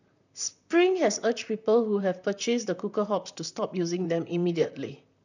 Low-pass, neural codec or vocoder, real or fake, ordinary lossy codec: 7.2 kHz; vocoder, 44.1 kHz, 128 mel bands, Pupu-Vocoder; fake; none